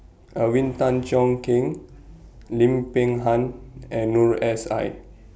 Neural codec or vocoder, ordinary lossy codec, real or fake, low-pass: none; none; real; none